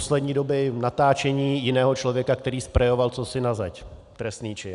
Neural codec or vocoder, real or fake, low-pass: none; real; 10.8 kHz